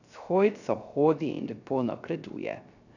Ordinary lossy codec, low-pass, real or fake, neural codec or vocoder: none; 7.2 kHz; fake; codec, 16 kHz, 0.3 kbps, FocalCodec